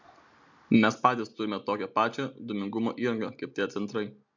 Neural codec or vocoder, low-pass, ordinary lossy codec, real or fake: none; 7.2 kHz; MP3, 64 kbps; real